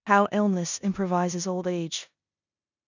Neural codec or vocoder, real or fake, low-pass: codec, 16 kHz in and 24 kHz out, 0.9 kbps, LongCat-Audio-Codec, four codebook decoder; fake; 7.2 kHz